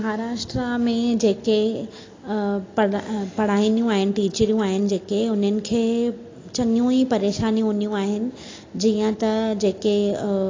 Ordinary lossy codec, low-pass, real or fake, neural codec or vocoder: AAC, 32 kbps; 7.2 kHz; real; none